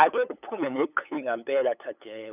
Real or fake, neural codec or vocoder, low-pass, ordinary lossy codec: fake; codec, 16 kHz, 8 kbps, FunCodec, trained on LibriTTS, 25 frames a second; 3.6 kHz; none